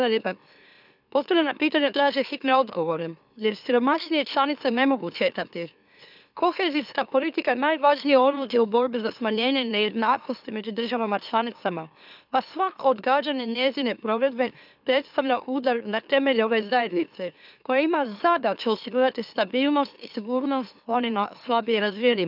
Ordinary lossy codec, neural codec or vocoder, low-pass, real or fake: none; autoencoder, 44.1 kHz, a latent of 192 numbers a frame, MeloTTS; 5.4 kHz; fake